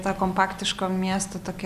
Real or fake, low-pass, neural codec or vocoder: real; 14.4 kHz; none